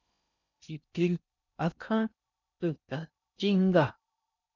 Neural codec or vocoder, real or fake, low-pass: codec, 16 kHz in and 24 kHz out, 0.6 kbps, FocalCodec, streaming, 2048 codes; fake; 7.2 kHz